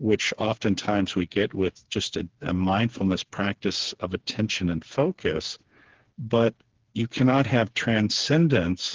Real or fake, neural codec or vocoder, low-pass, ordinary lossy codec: fake; codec, 16 kHz, 4 kbps, FreqCodec, smaller model; 7.2 kHz; Opus, 16 kbps